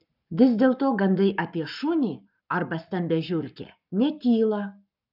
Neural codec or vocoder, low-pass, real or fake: codec, 44.1 kHz, 7.8 kbps, Pupu-Codec; 5.4 kHz; fake